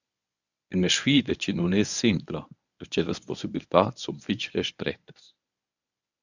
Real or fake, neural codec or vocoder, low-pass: fake; codec, 24 kHz, 0.9 kbps, WavTokenizer, medium speech release version 1; 7.2 kHz